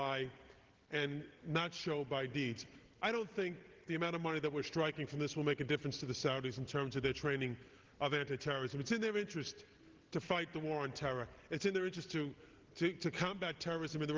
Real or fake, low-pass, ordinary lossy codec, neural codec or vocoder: real; 7.2 kHz; Opus, 16 kbps; none